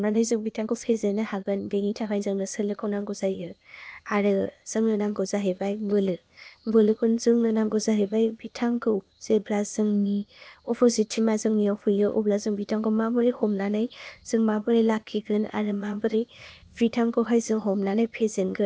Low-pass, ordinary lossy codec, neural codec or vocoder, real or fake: none; none; codec, 16 kHz, 0.8 kbps, ZipCodec; fake